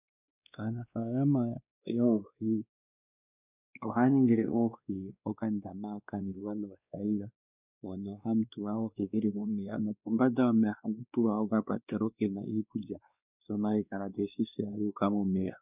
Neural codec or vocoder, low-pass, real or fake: codec, 16 kHz, 2 kbps, X-Codec, WavLM features, trained on Multilingual LibriSpeech; 3.6 kHz; fake